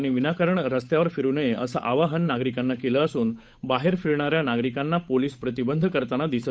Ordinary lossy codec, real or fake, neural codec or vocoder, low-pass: none; fake; codec, 16 kHz, 8 kbps, FunCodec, trained on Chinese and English, 25 frames a second; none